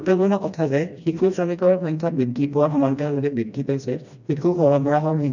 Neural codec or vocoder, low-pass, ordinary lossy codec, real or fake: codec, 16 kHz, 1 kbps, FreqCodec, smaller model; 7.2 kHz; none; fake